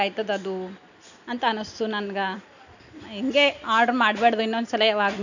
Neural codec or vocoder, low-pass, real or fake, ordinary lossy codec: none; 7.2 kHz; real; none